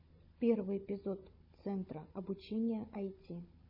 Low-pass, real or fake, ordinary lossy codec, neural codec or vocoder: 5.4 kHz; fake; MP3, 24 kbps; codec, 16 kHz, 16 kbps, FunCodec, trained on Chinese and English, 50 frames a second